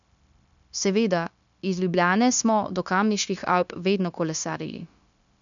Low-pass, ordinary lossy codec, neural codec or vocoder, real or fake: 7.2 kHz; none; codec, 16 kHz, 0.9 kbps, LongCat-Audio-Codec; fake